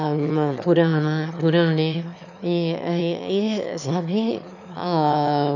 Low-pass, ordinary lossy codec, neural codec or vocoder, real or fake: 7.2 kHz; none; autoencoder, 22.05 kHz, a latent of 192 numbers a frame, VITS, trained on one speaker; fake